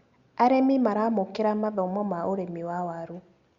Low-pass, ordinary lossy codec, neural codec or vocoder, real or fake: 7.2 kHz; Opus, 64 kbps; none; real